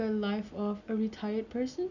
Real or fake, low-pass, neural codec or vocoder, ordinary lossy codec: real; 7.2 kHz; none; none